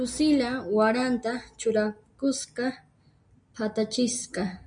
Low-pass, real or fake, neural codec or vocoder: 9.9 kHz; real; none